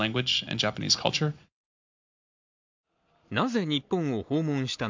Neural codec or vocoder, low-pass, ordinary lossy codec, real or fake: none; 7.2 kHz; MP3, 64 kbps; real